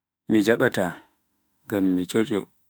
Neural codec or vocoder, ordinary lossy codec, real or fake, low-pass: autoencoder, 48 kHz, 32 numbers a frame, DAC-VAE, trained on Japanese speech; none; fake; none